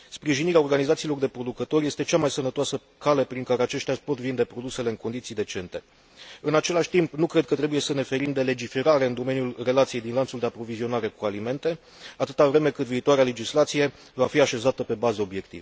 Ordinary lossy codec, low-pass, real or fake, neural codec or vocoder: none; none; real; none